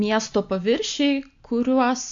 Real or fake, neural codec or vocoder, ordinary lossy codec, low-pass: real; none; AAC, 64 kbps; 7.2 kHz